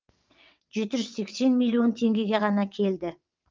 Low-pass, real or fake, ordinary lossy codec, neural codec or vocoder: 7.2 kHz; fake; Opus, 24 kbps; vocoder, 22.05 kHz, 80 mel bands, WaveNeXt